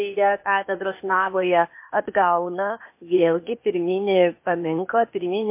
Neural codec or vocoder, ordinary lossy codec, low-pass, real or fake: codec, 16 kHz, 0.8 kbps, ZipCodec; MP3, 24 kbps; 3.6 kHz; fake